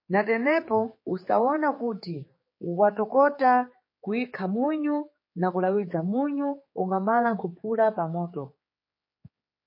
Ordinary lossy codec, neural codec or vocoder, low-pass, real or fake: MP3, 24 kbps; codec, 16 kHz, 4 kbps, X-Codec, HuBERT features, trained on general audio; 5.4 kHz; fake